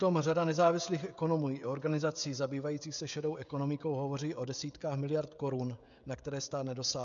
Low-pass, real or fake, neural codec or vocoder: 7.2 kHz; fake; codec, 16 kHz, 16 kbps, FreqCodec, smaller model